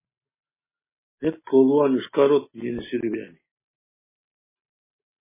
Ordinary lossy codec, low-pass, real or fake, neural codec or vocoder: MP3, 16 kbps; 3.6 kHz; real; none